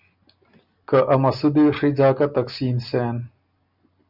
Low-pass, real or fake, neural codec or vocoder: 5.4 kHz; real; none